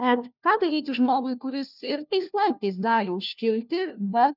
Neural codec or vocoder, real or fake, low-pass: codec, 16 kHz, 1 kbps, FunCodec, trained on LibriTTS, 50 frames a second; fake; 5.4 kHz